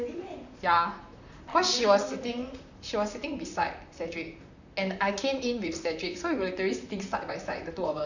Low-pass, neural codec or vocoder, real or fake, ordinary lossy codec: 7.2 kHz; vocoder, 44.1 kHz, 128 mel bands, Pupu-Vocoder; fake; none